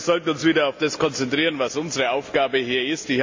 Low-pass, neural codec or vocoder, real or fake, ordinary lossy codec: 7.2 kHz; none; real; MP3, 48 kbps